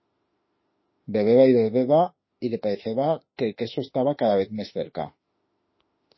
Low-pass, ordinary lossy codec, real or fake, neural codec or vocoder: 7.2 kHz; MP3, 24 kbps; fake; autoencoder, 48 kHz, 32 numbers a frame, DAC-VAE, trained on Japanese speech